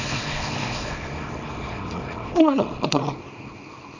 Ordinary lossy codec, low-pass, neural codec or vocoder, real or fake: none; 7.2 kHz; codec, 24 kHz, 0.9 kbps, WavTokenizer, small release; fake